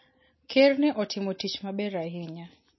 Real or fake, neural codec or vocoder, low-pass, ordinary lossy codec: real; none; 7.2 kHz; MP3, 24 kbps